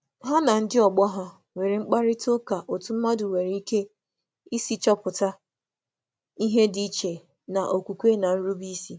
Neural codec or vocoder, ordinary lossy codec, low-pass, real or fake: none; none; none; real